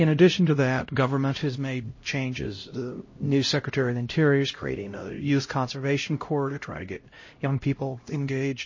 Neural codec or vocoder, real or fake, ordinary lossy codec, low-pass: codec, 16 kHz, 0.5 kbps, X-Codec, HuBERT features, trained on LibriSpeech; fake; MP3, 32 kbps; 7.2 kHz